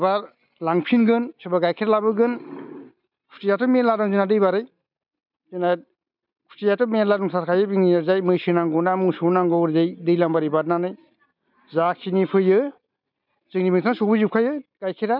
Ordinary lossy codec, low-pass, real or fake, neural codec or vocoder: none; 5.4 kHz; real; none